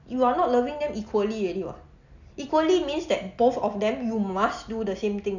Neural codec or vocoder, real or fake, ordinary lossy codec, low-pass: none; real; none; 7.2 kHz